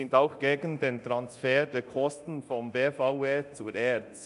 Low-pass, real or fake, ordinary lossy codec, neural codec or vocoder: 10.8 kHz; fake; none; codec, 24 kHz, 0.9 kbps, DualCodec